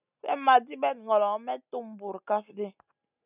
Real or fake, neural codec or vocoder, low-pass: real; none; 3.6 kHz